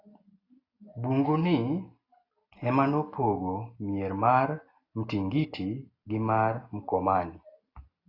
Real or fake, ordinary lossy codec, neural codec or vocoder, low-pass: real; AAC, 32 kbps; none; 5.4 kHz